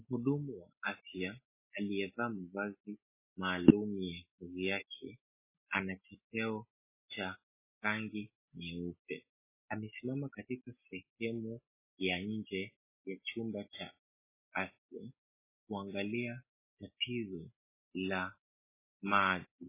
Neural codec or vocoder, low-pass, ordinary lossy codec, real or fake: none; 3.6 kHz; MP3, 16 kbps; real